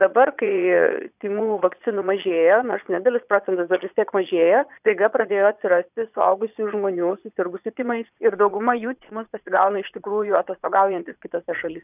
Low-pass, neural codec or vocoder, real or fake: 3.6 kHz; vocoder, 22.05 kHz, 80 mel bands, Vocos; fake